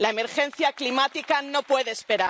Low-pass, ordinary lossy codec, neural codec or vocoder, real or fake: none; none; none; real